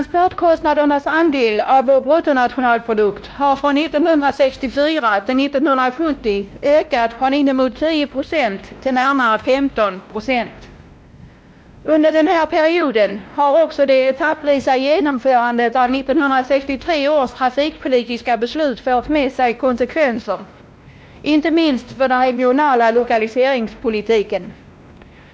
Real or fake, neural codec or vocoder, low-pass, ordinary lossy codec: fake; codec, 16 kHz, 1 kbps, X-Codec, WavLM features, trained on Multilingual LibriSpeech; none; none